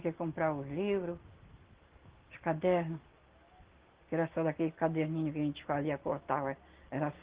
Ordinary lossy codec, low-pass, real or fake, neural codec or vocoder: Opus, 16 kbps; 3.6 kHz; real; none